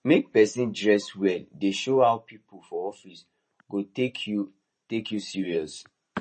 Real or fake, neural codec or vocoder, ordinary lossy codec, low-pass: fake; vocoder, 44.1 kHz, 128 mel bands every 512 samples, BigVGAN v2; MP3, 32 kbps; 10.8 kHz